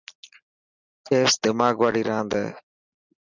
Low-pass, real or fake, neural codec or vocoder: 7.2 kHz; real; none